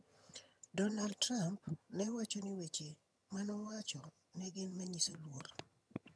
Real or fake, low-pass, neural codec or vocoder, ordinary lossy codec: fake; none; vocoder, 22.05 kHz, 80 mel bands, HiFi-GAN; none